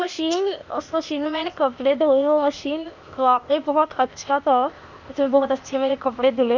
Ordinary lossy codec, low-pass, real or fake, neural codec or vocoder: none; 7.2 kHz; fake; codec, 16 kHz, 0.8 kbps, ZipCodec